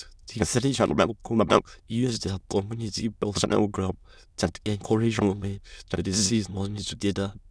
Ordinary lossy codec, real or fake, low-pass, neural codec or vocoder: none; fake; none; autoencoder, 22.05 kHz, a latent of 192 numbers a frame, VITS, trained on many speakers